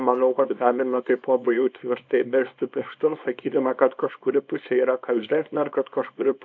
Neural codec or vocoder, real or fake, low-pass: codec, 24 kHz, 0.9 kbps, WavTokenizer, small release; fake; 7.2 kHz